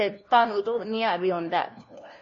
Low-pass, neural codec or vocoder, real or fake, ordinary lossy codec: 7.2 kHz; codec, 16 kHz, 1 kbps, FunCodec, trained on LibriTTS, 50 frames a second; fake; MP3, 32 kbps